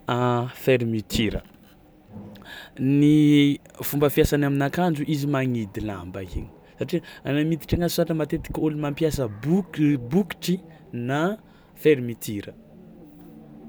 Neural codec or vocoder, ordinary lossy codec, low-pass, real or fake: none; none; none; real